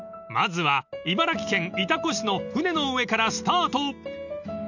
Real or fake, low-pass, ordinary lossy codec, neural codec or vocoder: real; 7.2 kHz; none; none